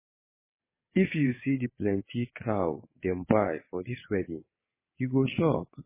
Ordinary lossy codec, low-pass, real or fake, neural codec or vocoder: MP3, 16 kbps; 3.6 kHz; fake; vocoder, 22.05 kHz, 80 mel bands, WaveNeXt